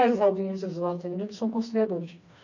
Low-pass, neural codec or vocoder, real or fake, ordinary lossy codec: 7.2 kHz; codec, 16 kHz, 2 kbps, FreqCodec, smaller model; fake; none